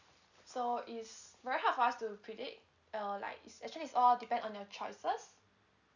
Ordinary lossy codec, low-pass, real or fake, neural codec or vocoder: none; 7.2 kHz; real; none